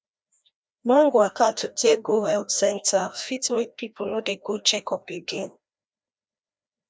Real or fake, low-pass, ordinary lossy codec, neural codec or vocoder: fake; none; none; codec, 16 kHz, 1 kbps, FreqCodec, larger model